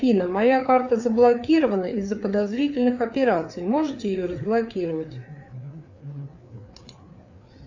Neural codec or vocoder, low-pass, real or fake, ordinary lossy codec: codec, 16 kHz, 4 kbps, FreqCodec, larger model; 7.2 kHz; fake; AAC, 48 kbps